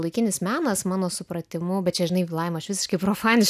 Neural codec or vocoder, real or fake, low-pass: none; real; 14.4 kHz